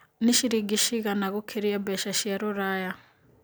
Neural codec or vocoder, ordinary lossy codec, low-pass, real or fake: none; none; none; real